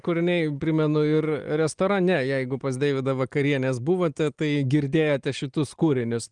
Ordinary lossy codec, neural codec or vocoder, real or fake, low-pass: Opus, 24 kbps; none; real; 9.9 kHz